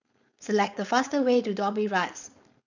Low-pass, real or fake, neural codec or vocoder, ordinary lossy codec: 7.2 kHz; fake; codec, 16 kHz, 4.8 kbps, FACodec; none